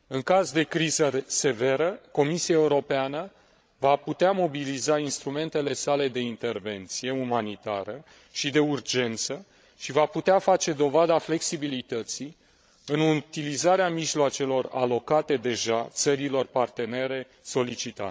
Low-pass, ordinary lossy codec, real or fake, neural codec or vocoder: none; none; fake; codec, 16 kHz, 16 kbps, FreqCodec, larger model